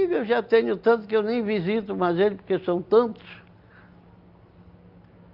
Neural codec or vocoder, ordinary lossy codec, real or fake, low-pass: none; Opus, 24 kbps; real; 5.4 kHz